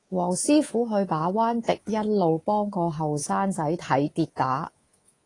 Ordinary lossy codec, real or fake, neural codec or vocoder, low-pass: AAC, 32 kbps; fake; codec, 24 kHz, 3.1 kbps, DualCodec; 10.8 kHz